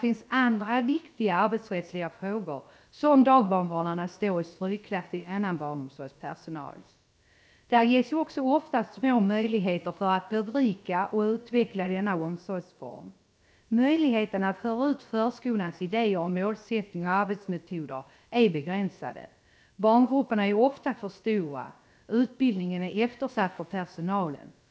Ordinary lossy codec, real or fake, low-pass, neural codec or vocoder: none; fake; none; codec, 16 kHz, about 1 kbps, DyCAST, with the encoder's durations